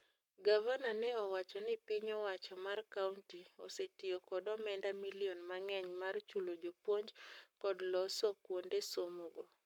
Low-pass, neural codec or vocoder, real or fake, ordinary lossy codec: 19.8 kHz; codec, 44.1 kHz, 7.8 kbps, Pupu-Codec; fake; MP3, 96 kbps